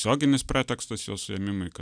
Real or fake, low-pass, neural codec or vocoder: real; 9.9 kHz; none